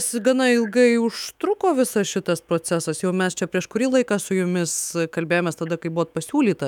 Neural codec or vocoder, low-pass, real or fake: autoencoder, 48 kHz, 128 numbers a frame, DAC-VAE, trained on Japanese speech; 19.8 kHz; fake